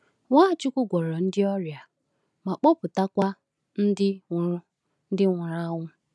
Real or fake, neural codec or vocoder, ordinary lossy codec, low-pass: real; none; none; none